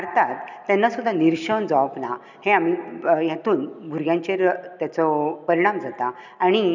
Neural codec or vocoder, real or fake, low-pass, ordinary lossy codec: none; real; 7.2 kHz; none